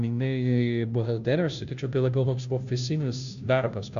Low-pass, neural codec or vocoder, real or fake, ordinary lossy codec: 7.2 kHz; codec, 16 kHz, 0.5 kbps, FunCodec, trained on Chinese and English, 25 frames a second; fake; AAC, 96 kbps